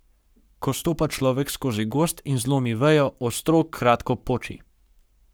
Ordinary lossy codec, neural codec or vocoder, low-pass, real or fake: none; codec, 44.1 kHz, 7.8 kbps, Pupu-Codec; none; fake